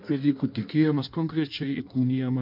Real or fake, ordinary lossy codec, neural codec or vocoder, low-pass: fake; MP3, 48 kbps; codec, 16 kHz in and 24 kHz out, 1.1 kbps, FireRedTTS-2 codec; 5.4 kHz